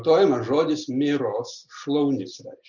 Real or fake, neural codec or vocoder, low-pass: real; none; 7.2 kHz